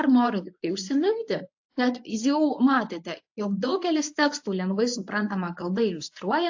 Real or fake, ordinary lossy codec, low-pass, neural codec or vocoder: fake; AAC, 48 kbps; 7.2 kHz; codec, 24 kHz, 0.9 kbps, WavTokenizer, medium speech release version 1